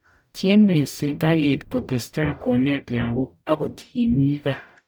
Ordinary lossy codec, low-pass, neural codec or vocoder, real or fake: none; none; codec, 44.1 kHz, 0.9 kbps, DAC; fake